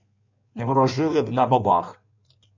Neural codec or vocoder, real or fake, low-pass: codec, 16 kHz in and 24 kHz out, 1.1 kbps, FireRedTTS-2 codec; fake; 7.2 kHz